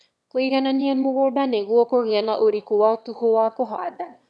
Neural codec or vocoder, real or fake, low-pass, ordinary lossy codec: autoencoder, 22.05 kHz, a latent of 192 numbers a frame, VITS, trained on one speaker; fake; none; none